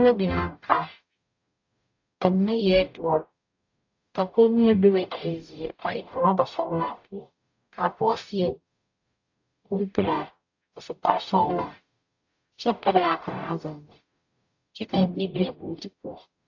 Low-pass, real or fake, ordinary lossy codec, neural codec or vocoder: 7.2 kHz; fake; AAC, 48 kbps; codec, 44.1 kHz, 0.9 kbps, DAC